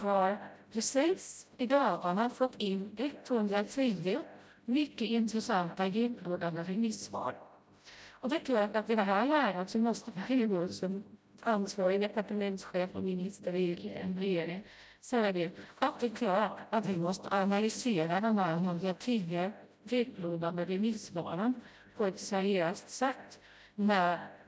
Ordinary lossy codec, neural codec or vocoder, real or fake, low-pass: none; codec, 16 kHz, 0.5 kbps, FreqCodec, smaller model; fake; none